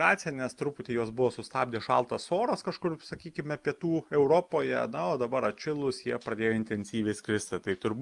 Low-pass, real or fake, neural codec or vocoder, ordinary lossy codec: 10.8 kHz; real; none; Opus, 32 kbps